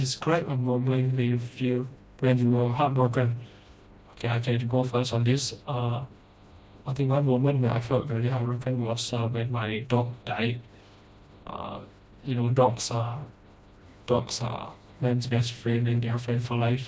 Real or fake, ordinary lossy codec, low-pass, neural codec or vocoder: fake; none; none; codec, 16 kHz, 1 kbps, FreqCodec, smaller model